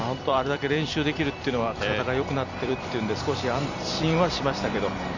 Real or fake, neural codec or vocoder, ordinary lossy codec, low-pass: fake; vocoder, 44.1 kHz, 128 mel bands every 256 samples, BigVGAN v2; none; 7.2 kHz